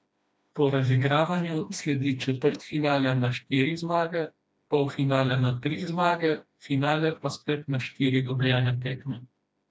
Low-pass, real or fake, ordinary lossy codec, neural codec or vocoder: none; fake; none; codec, 16 kHz, 1 kbps, FreqCodec, smaller model